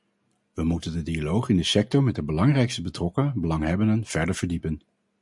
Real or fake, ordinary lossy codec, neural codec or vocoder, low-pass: real; MP3, 96 kbps; none; 10.8 kHz